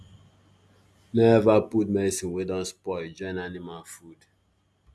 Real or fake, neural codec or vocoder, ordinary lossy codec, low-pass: real; none; none; none